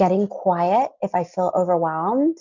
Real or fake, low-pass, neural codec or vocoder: real; 7.2 kHz; none